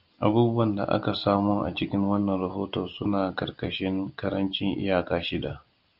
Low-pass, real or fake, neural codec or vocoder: 5.4 kHz; real; none